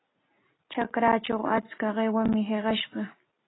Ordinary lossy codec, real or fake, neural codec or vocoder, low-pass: AAC, 16 kbps; real; none; 7.2 kHz